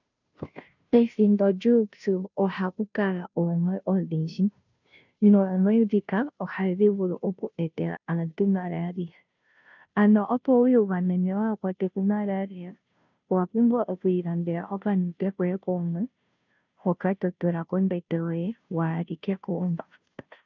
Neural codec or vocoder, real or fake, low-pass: codec, 16 kHz, 0.5 kbps, FunCodec, trained on Chinese and English, 25 frames a second; fake; 7.2 kHz